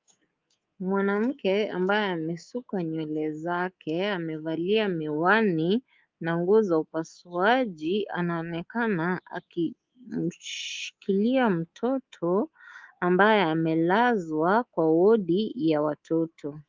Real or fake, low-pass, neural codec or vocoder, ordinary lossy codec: fake; 7.2 kHz; codec, 24 kHz, 3.1 kbps, DualCodec; Opus, 32 kbps